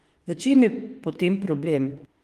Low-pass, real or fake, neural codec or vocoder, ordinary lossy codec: 14.4 kHz; fake; autoencoder, 48 kHz, 32 numbers a frame, DAC-VAE, trained on Japanese speech; Opus, 24 kbps